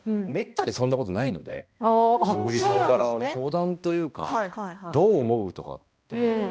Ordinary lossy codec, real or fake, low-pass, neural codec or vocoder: none; fake; none; codec, 16 kHz, 1 kbps, X-Codec, HuBERT features, trained on balanced general audio